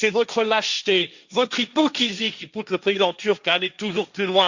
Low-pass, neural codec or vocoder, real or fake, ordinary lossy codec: 7.2 kHz; codec, 16 kHz, 1.1 kbps, Voila-Tokenizer; fake; Opus, 64 kbps